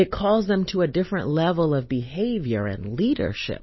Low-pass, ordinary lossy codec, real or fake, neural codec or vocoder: 7.2 kHz; MP3, 24 kbps; real; none